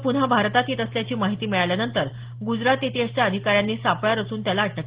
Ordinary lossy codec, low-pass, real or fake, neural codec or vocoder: Opus, 16 kbps; 3.6 kHz; real; none